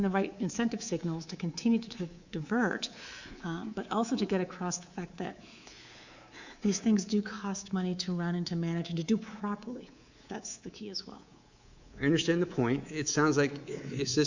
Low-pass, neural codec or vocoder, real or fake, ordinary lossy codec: 7.2 kHz; codec, 24 kHz, 3.1 kbps, DualCodec; fake; Opus, 64 kbps